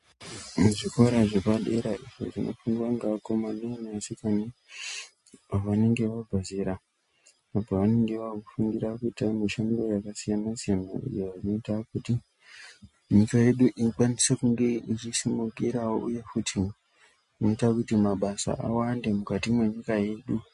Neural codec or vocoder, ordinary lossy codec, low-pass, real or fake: none; MP3, 48 kbps; 10.8 kHz; real